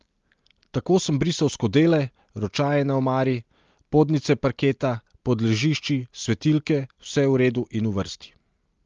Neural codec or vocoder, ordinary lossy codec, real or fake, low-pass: none; Opus, 32 kbps; real; 7.2 kHz